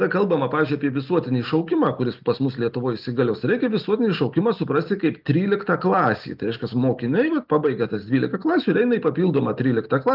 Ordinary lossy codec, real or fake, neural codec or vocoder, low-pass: Opus, 24 kbps; real; none; 5.4 kHz